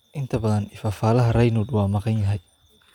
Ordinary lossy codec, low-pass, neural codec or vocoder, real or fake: none; 19.8 kHz; vocoder, 44.1 kHz, 128 mel bands every 512 samples, BigVGAN v2; fake